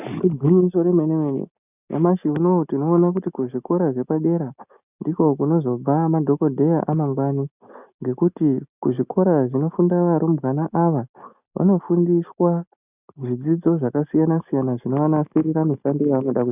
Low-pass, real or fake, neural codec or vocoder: 3.6 kHz; real; none